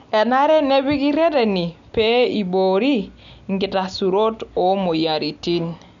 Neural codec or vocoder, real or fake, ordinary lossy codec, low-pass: none; real; none; 7.2 kHz